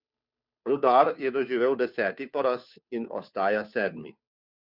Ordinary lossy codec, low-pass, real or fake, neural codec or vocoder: none; 5.4 kHz; fake; codec, 16 kHz, 2 kbps, FunCodec, trained on Chinese and English, 25 frames a second